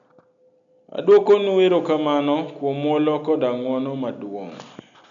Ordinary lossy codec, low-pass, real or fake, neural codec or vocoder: none; 7.2 kHz; real; none